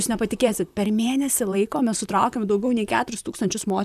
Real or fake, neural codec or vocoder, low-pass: fake; vocoder, 44.1 kHz, 128 mel bands, Pupu-Vocoder; 14.4 kHz